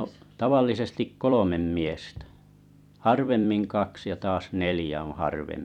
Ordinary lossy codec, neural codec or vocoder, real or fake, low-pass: none; vocoder, 44.1 kHz, 128 mel bands every 256 samples, BigVGAN v2; fake; 19.8 kHz